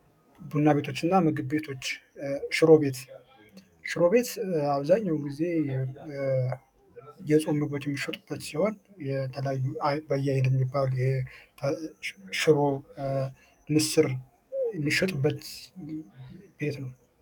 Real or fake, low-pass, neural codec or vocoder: fake; 19.8 kHz; codec, 44.1 kHz, 7.8 kbps, DAC